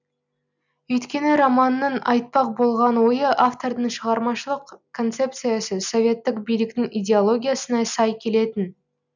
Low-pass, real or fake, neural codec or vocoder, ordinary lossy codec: 7.2 kHz; real; none; none